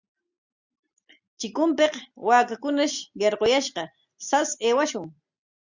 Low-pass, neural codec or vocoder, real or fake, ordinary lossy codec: 7.2 kHz; none; real; Opus, 64 kbps